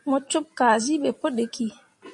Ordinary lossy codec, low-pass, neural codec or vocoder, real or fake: MP3, 48 kbps; 10.8 kHz; none; real